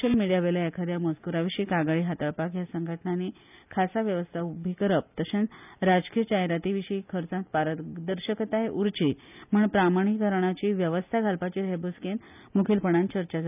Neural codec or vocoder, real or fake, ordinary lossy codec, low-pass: none; real; none; 3.6 kHz